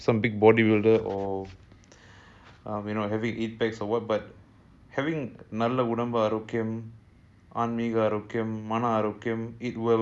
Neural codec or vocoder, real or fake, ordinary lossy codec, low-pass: none; real; none; none